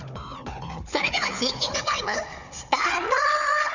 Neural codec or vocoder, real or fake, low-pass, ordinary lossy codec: codec, 16 kHz, 4 kbps, FunCodec, trained on Chinese and English, 50 frames a second; fake; 7.2 kHz; none